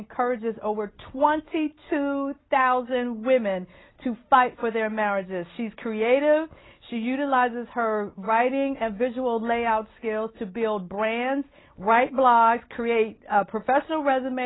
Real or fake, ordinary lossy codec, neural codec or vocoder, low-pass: real; AAC, 16 kbps; none; 7.2 kHz